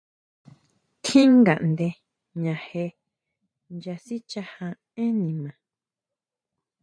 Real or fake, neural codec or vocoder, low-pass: fake; vocoder, 44.1 kHz, 128 mel bands every 512 samples, BigVGAN v2; 9.9 kHz